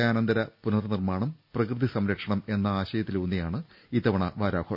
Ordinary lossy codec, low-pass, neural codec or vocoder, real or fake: none; 5.4 kHz; none; real